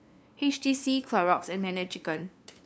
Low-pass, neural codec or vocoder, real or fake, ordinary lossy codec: none; codec, 16 kHz, 2 kbps, FunCodec, trained on LibriTTS, 25 frames a second; fake; none